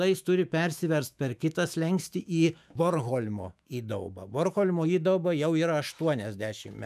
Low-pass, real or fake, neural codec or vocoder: 14.4 kHz; fake; autoencoder, 48 kHz, 128 numbers a frame, DAC-VAE, trained on Japanese speech